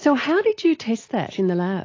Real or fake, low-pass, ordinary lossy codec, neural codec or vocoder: real; 7.2 kHz; AAC, 32 kbps; none